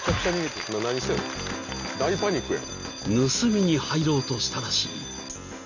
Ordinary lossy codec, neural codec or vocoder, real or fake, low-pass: none; none; real; 7.2 kHz